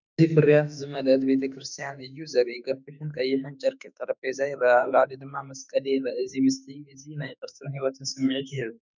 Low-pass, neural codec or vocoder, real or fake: 7.2 kHz; autoencoder, 48 kHz, 32 numbers a frame, DAC-VAE, trained on Japanese speech; fake